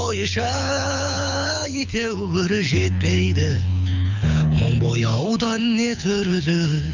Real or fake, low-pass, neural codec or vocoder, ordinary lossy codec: fake; 7.2 kHz; codec, 24 kHz, 6 kbps, HILCodec; none